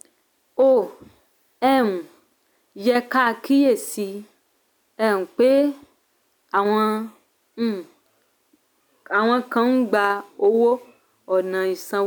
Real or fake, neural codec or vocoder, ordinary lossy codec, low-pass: real; none; none; none